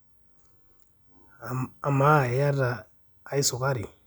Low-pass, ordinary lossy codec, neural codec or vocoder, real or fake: none; none; none; real